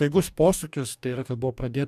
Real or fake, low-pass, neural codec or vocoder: fake; 14.4 kHz; codec, 44.1 kHz, 2.6 kbps, DAC